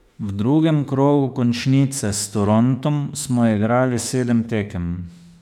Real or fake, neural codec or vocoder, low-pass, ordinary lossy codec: fake; autoencoder, 48 kHz, 32 numbers a frame, DAC-VAE, trained on Japanese speech; 19.8 kHz; none